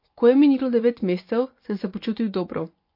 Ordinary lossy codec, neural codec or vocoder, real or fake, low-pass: MP3, 32 kbps; vocoder, 24 kHz, 100 mel bands, Vocos; fake; 5.4 kHz